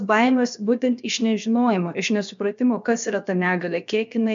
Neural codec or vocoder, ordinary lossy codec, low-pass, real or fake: codec, 16 kHz, 0.7 kbps, FocalCodec; MP3, 64 kbps; 7.2 kHz; fake